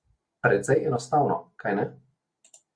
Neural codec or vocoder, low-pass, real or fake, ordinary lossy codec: none; 9.9 kHz; real; Opus, 64 kbps